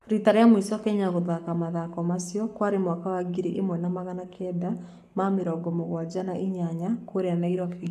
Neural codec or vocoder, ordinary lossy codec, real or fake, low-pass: codec, 44.1 kHz, 7.8 kbps, Pupu-Codec; none; fake; 14.4 kHz